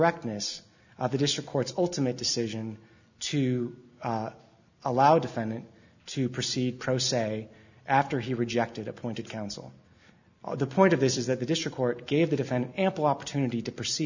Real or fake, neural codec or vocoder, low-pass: real; none; 7.2 kHz